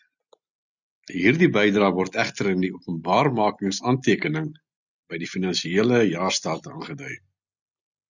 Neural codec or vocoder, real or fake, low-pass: none; real; 7.2 kHz